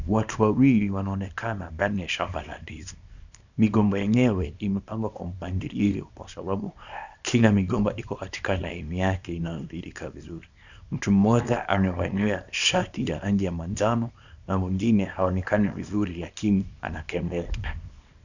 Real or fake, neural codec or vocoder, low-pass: fake; codec, 24 kHz, 0.9 kbps, WavTokenizer, small release; 7.2 kHz